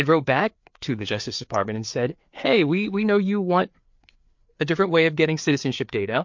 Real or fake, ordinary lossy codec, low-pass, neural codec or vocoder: fake; MP3, 48 kbps; 7.2 kHz; codec, 16 kHz, 4 kbps, FreqCodec, larger model